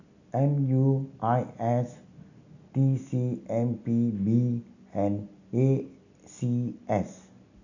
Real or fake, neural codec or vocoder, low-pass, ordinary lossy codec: real; none; 7.2 kHz; none